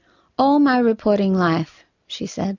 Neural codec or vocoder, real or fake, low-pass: none; real; 7.2 kHz